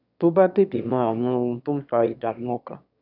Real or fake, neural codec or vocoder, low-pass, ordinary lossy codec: fake; autoencoder, 22.05 kHz, a latent of 192 numbers a frame, VITS, trained on one speaker; 5.4 kHz; AAC, 48 kbps